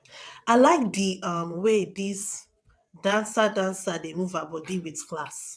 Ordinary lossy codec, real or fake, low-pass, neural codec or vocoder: none; fake; none; vocoder, 22.05 kHz, 80 mel bands, WaveNeXt